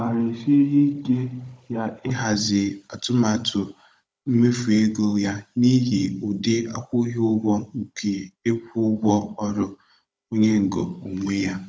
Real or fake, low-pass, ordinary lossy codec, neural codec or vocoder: fake; none; none; codec, 16 kHz, 16 kbps, FunCodec, trained on Chinese and English, 50 frames a second